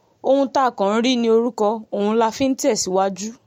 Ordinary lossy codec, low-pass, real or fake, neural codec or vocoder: MP3, 64 kbps; 19.8 kHz; real; none